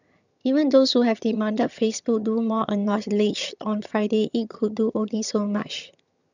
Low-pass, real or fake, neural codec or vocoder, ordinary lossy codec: 7.2 kHz; fake; vocoder, 22.05 kHz, 80 mel bands, HiFi-GAN; none